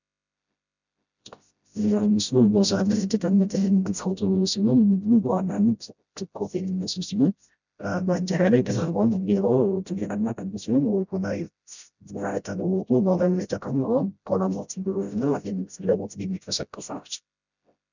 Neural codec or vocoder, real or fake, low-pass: codec, 16 kHz, 0.5 kbps, FreqCodec, smaller model; fake; 7.2 kHz